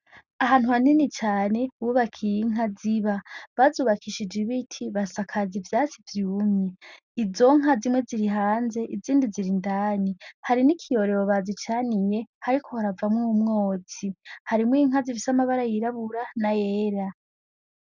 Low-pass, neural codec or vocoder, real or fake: 7.2 kHz; none; real